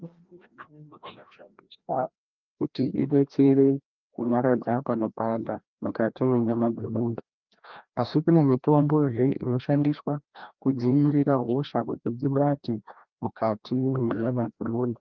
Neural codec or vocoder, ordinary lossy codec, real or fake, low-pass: codec, 16 kHz, 1 kbps, FreqCodec, larger model; Opus, 32 kbps; fake; 7.2 kHz